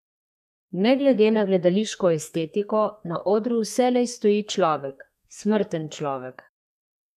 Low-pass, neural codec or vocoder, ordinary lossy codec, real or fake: 14.4 kHz; codec, 32 kHz, 1.9 kbps, SNAC; none; fake